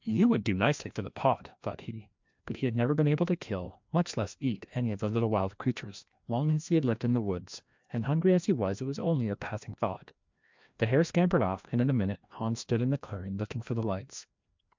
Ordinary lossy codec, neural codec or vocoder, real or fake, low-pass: MP3, 64 kbps; codec, 16 kHz, 1 kbps, FreqCodec, larger model; fake; 7.2 kHz